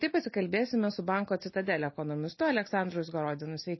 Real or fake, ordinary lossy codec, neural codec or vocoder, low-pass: real; MP3, 24 kbps; none; 7.2 kHz